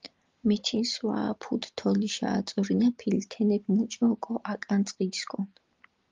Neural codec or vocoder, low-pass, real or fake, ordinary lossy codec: none; 7.2 kHz; real; Opus, 32 kbps